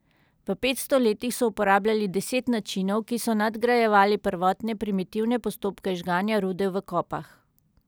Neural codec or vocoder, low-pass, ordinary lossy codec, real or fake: none; none; none; real